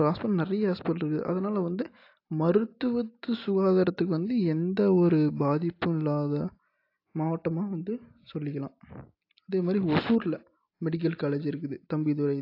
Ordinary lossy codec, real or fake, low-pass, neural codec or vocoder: AAC, 32 kbps; real; 5.4 kHz; none